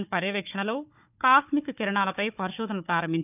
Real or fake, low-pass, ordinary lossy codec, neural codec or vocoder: fake; 3.6 kHz; none; codec, 16 kHz, 4 kbps, FunCodec, trained on Chinese and English, 50 frames a second